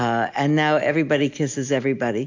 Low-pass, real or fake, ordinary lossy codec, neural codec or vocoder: 7.2 kHz; real; AAC, 48 kbps; none